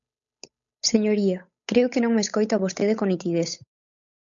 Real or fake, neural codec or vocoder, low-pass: fake; codec, 16 kHz, 8 kbps, FunCodec, trained on Chinese and English, 25 frames a second; 7.2 kHz